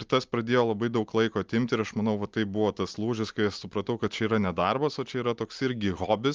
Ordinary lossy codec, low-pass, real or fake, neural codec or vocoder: Opus, 32 kbps; 7.2 kHz; real; none